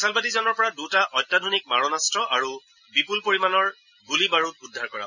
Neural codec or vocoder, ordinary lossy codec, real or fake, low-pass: none; none; real; 7.2 kHz